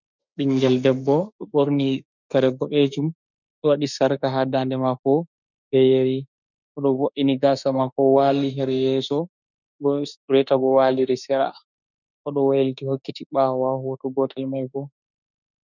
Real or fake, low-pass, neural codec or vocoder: fake; 7.2 kHz; autoencoder, 48 kHz, 32 numbers a frame, DAC-VAE, trained on Japanese speech